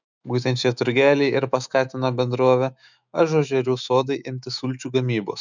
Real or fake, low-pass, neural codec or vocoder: fake; 7.2 kHz; autoencoder, 48 kHz, 128 numbers a frame, DAC-VAE, trained on Japanese speech